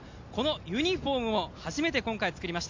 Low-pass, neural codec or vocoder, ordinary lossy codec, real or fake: 7.2 kHz; none; MP3, 48 kbps; real